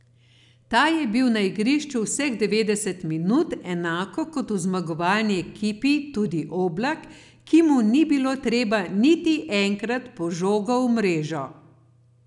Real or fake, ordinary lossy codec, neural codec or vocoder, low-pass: real; none; none; 10.8 kHz